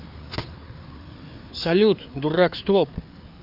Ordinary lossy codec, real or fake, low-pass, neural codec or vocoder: none; fake; 5.4 kHz; codec, 16 kHz, 4 kbps, FreqCodec, larger model